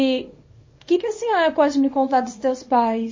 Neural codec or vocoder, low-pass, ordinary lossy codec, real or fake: codec, 24 kHz, 0.9 kbps, WavTokenizer, small release; 7.2 kHz; MP3, 32 kbps; fake